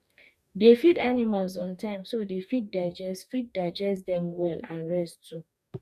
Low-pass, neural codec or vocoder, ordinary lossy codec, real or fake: 14.4 kHz; codec, 44.1 kHz, 2.6 kbps, DAC; none; fake